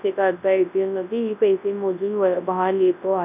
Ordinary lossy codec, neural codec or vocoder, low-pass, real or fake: none; codec, 24 kHz, 0.9 kbps, WavTokenizer, large speech release; 3.6 kHz; fake